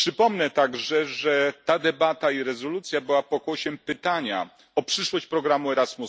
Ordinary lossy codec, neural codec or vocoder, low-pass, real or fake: none; none; none; real